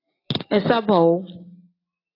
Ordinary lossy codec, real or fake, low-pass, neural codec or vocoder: AAC, 24 kbps; real; 5.4 kHz; none